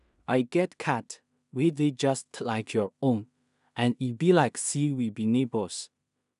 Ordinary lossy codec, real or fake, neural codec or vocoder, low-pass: none; fake; codec, 16 kHz in and 24 kHz out, 0.4 kbps, LongCat-Audio-Codec, two codebook decoder; 10.8 kHz